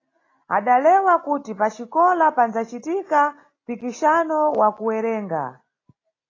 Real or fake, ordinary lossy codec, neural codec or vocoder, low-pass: real; AAC, 32 kbps; none; 7.2 kHz